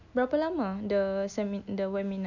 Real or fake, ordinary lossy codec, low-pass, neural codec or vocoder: real; none; 7.2 kHz; none